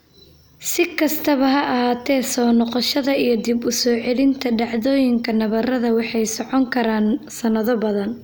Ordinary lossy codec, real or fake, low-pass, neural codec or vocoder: none; real; none; none